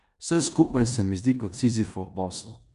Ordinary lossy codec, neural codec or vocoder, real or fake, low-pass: none; codec, 16 kHz in and 24 kHz out, 0.9 kbps, LongCat-Audio-Codec, four codebook decoder; fake; 10.8 kHz